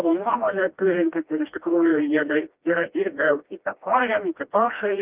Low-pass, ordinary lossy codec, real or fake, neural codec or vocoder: 3.6 kHz; Opus, 32 kbps; fake; codec, 16 kHz, 1 kbps, FreqCodec, smaller model